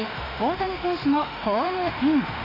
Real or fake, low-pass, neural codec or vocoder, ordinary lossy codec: fake; 5.4 kHz; autoencoder, 48 kHz, 32 numbers a frame, DAC-VAE, trained on Japanese speech; none